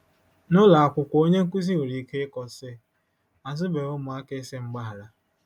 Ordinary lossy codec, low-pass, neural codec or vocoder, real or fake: none; 19.8 kHz; none; real